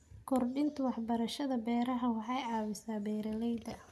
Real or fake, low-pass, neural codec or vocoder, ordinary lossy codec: real; 14.4 kHz; none; none